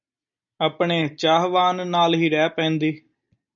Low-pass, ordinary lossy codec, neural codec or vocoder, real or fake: 7.2 kHz; MP3, 96 kbps; none; real